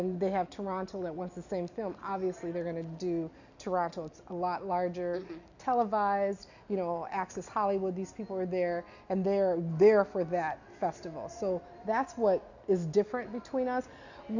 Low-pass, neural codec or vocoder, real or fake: 7.2 kHz; none; real